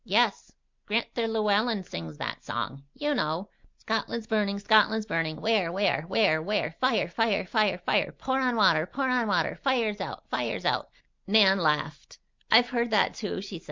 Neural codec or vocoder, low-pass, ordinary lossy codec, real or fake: none; 7.2 kHz; MP3, 64 kbps; real